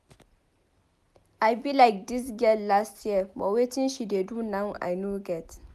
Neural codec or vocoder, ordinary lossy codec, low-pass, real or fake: none; none; 14.4 kHz; real